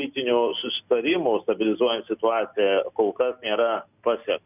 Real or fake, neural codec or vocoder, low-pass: real; none; 3.6 kHz